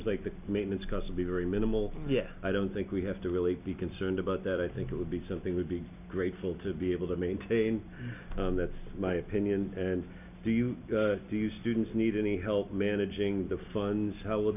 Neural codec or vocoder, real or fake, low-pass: none; real; 3.6 kHz